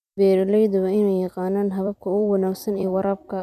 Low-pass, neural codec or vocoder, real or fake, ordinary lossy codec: 14.4 kHz; vocoder, 44.1 kHz, 128 mel bands every 256 samples, BigVGAN v2; fake; none